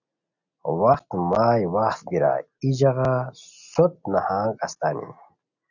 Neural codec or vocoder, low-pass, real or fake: none; 7.2 kHz; real